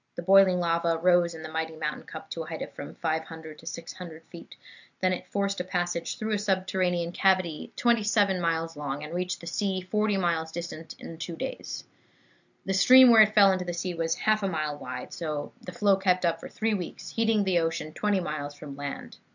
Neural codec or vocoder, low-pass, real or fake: none; 7.2 kHz; real